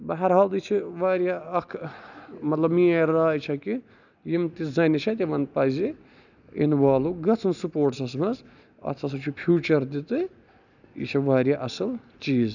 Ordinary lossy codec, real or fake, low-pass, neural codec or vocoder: none; real; 7.2 kHz; none